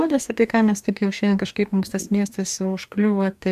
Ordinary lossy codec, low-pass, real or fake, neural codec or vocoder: MP3, 96 kbps; 14.4 kHz; fake; codec, 44.1 kHz, 2.6 kbps, DAC